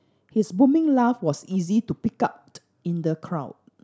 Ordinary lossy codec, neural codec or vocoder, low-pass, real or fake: none; none; none; real